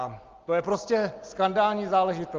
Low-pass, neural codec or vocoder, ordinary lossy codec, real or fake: 7.2 kHz; none; Opus, 32 kbps; real